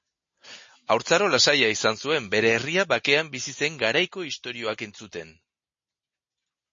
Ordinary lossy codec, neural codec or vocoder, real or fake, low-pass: MP3, 32 kbps; none; real; 7.2 kHz